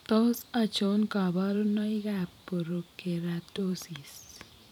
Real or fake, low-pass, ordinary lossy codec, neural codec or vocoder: fake; 19.8 kHz; none; vocoder, 44.1 kHz, 128 mel bands every 512 samples, BigVGAN v2